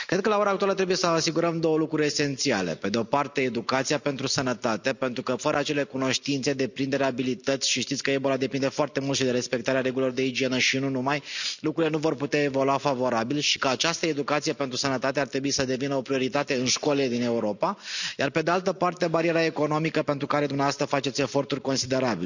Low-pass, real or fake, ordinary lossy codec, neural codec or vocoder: 7.2 kHz; real; none; none